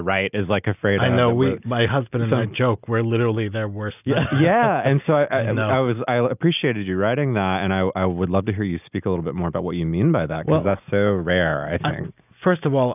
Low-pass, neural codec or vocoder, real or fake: 3.6 kHz; none; real